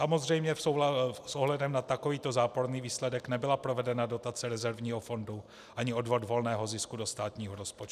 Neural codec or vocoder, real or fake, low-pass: none; real; 14.4 kHz